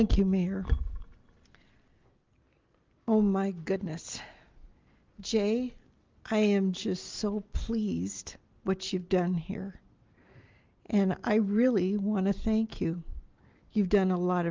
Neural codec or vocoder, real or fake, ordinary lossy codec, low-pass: none; real; Opus, 32 kbps; 7.2 kHz